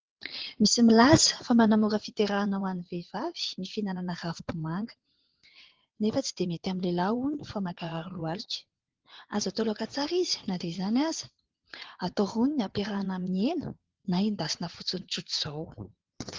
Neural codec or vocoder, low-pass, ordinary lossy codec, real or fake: vocoder, 22.05 kHz, 80 mel bands, WaveNeXt; 7.2 kHz; Opus, 16 kbps; fake